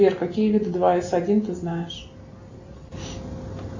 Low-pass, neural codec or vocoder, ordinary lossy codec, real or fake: 7.2 kHz; none; AAC, 48 kbps; real